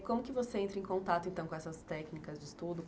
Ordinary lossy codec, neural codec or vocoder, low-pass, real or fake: none; none; none; real